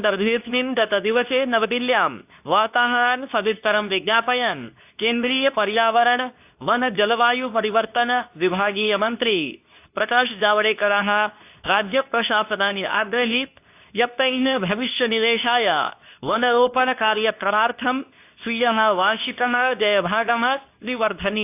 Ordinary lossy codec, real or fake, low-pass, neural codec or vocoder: AAC, 32 kbps; fake; 3.6 kHz; codec, 24 kHz, 0.9 kbps, WavTokenizer, medium speech release version 2